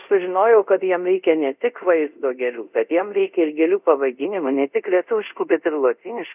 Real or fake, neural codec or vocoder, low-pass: fake; codec, 24 kHz, 0.5 kbps, DualCodec; 3.6 kHz